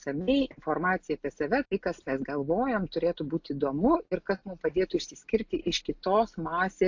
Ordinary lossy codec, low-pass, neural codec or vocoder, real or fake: AAC, 48 kbps; 7.2 kHz; none; real